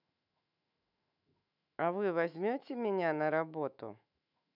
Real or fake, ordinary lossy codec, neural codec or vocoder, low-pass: fake; none; autoencoder, 48 kHz, 128 numbers a frame, DAC-VAE, trained on Japanese speech; 5.4 kHz